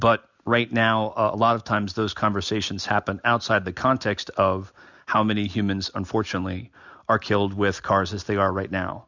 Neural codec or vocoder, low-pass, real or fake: none; 7.2 kHz; real